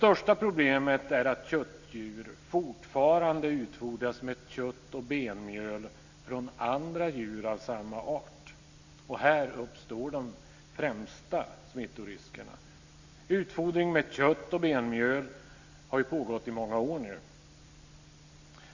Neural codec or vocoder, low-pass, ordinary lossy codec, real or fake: none; 7.2 kHz; Opus, 64 kbps; real